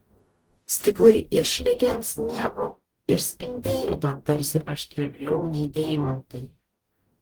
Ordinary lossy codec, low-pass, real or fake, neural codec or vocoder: Opus, 24 kbps; 19.8 kHz; fake; codec, 44.1 kHz, 0.9 kbps, DAC